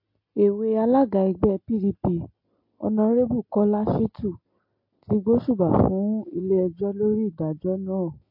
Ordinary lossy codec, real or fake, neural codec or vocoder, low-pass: MP3, 32 kbps; real; none; 5.4 kHz